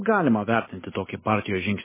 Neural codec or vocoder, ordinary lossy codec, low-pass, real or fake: none; MP3, 16 kbps; 3.6 kHz; real